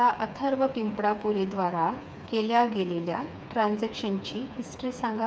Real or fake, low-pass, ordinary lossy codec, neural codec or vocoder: fake; none; none; codec, 16 kHz, 4 kbps, FreqCodec, smaller model